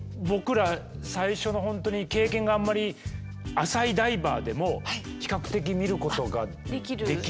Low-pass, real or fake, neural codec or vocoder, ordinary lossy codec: none; real; none; none